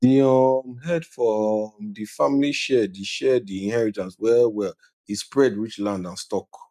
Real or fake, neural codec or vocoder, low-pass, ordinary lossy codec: real; none; 14.4 kHz; none